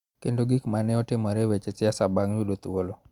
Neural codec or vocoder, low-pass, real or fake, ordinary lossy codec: none; 19.8 kHz; real; Opus, 64 kbps